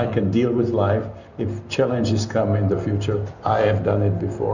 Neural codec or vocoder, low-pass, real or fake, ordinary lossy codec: none; 7.2 kHz; real; Opus, 64 kbps